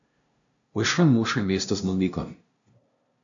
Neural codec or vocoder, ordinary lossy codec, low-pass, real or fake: codec, 16 kHz, 0.5 kbps, FunCodec, trained on LibriTTS, 25 frames a second; AAC, 64 kbps; 7.2 kHz; fake